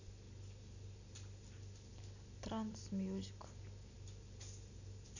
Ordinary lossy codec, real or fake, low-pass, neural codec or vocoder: none; real; 7.2 kHz; none